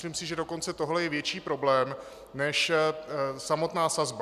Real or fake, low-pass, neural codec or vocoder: real; 14.4 kHz; none